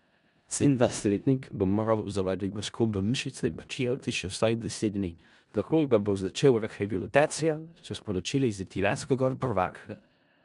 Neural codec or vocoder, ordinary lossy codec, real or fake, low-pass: codec, 16 kHz in and 24 kHz out, 0.4 kbps, LongCat-Audio-Codec, four codebook decoder; none; fake; 10.8 kHz